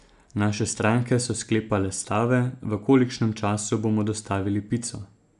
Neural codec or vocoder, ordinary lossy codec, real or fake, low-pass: none; none; real; 10.8 kHz